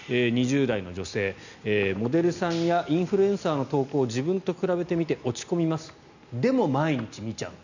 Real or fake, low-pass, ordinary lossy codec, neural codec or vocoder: real; 7.2 kHz; none; none